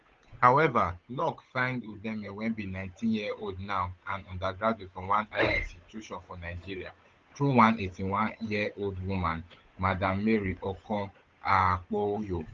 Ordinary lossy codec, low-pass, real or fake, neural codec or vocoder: Opus, 32 kbps; 7.2 kHz; fake; codec, 16 kHz, 8 kbps, FunCodec, trained on Chinese and English, 25 frames a second